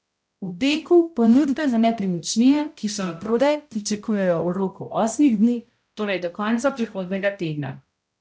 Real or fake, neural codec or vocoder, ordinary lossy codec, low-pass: fake; codec, 16 kHz, 0.5 kbps, X-Codec, HuBERT features, trained on balanced general audio; none; none